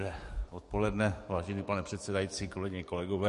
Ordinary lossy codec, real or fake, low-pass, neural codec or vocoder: MP3, 48 kbps; fake; 14.4 kHz; codec, 44.1 kHz, 7.8 kbps, DAC